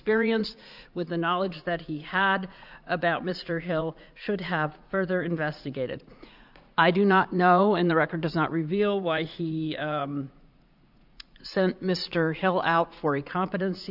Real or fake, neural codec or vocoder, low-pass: fake; vocoder, 44.1 kHz, 80 mel bands, Vocos; 5.4 kHz